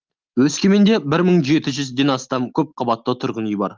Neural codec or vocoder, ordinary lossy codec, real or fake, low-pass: none; Opus, 32 kbps; real; 7.2 kHz